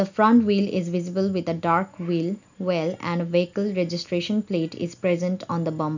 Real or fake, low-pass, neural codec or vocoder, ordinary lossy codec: real; 7.2 kHz; none; none